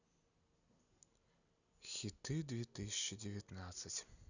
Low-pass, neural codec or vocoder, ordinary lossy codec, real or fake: 7.2 kHz; none; none; real